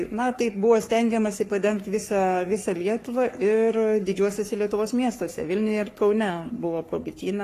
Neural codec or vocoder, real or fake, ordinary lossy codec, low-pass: codec, 44.1 kHz, 3.4 kbps, Pupu-Codec; fake; AAC, 48 kbps; 14.4 kHz